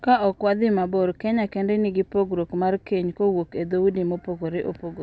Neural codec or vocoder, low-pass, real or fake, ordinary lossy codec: none; none; real; none